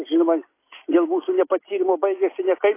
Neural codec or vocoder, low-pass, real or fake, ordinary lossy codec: none; 3.6 kHz; real; AAC, 24 kbps